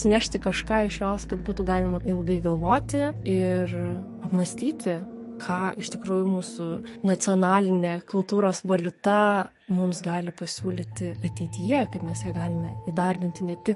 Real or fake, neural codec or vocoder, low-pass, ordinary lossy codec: fake; codec, 44.1 kHz, 2.6 kbps, SNAC; 14.4 kHz; MP3, 48 kbps